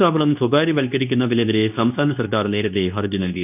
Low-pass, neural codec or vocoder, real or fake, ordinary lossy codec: 3.6 kHz; codec, 24 kHz, 0.9 kbps, WavTokenizer, medium speech release version 2; fake; none